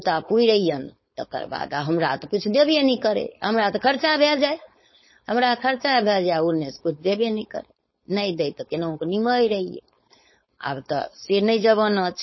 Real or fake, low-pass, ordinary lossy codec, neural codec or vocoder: fake; 7.2 kHz; MP3, 24 kbps; codec, 16 kHz, 4.8 kbps, FACodec